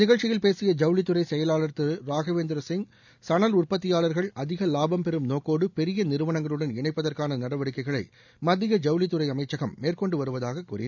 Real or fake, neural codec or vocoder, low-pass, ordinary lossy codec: real; none; 7.2 kHz; none